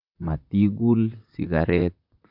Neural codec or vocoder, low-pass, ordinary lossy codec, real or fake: vocoder, 44.1 kHz, 128 mel bands, Pupu-Vocoder; 5.4 kHz; none; fake